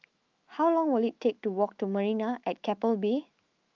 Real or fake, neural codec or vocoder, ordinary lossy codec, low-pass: fake; autoencoder, 48 kHz, 128 numbers a frame, DAC-VAE, trained on Japanese speech; Opus, 24 kbps; 7.2 kHz